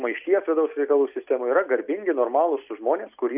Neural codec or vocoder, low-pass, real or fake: none; 3.6 kHz; real